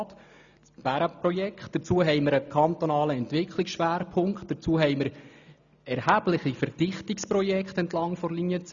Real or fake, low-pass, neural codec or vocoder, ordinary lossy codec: real; 7.2 kHz; none; none